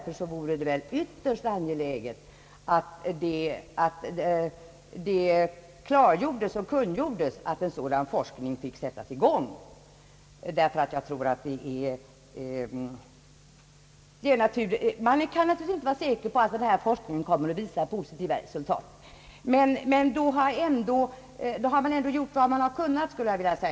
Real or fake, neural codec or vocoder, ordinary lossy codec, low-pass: real; none; none; none